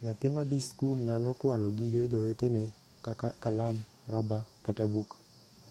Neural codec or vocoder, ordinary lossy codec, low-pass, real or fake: codec, 44.1 kHz, 2.6 kbps, DAC; MP3, 64 kbps; 19.8 kHz; fake